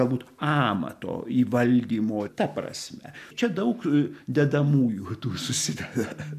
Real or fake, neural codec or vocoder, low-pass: real; none; 14.4 kHz